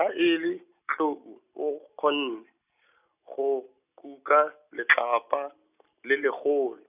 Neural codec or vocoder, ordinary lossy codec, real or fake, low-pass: none; none; real; 3.6 kHz